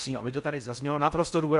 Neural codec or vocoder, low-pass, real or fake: codec, 16 kHz in and 24 kHz out, 0.6 kbps, FocalCodec, streaming, 4096 codes; 10.8 kHz; fake